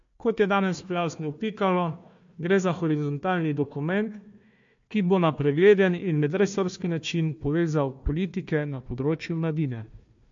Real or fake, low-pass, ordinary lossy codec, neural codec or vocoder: fake; 7.2 kHz; MP3, 48 kbps; codec, 16 kHz, 1 kbps, FunCodec, trained on Chinese and English, 50 frames a second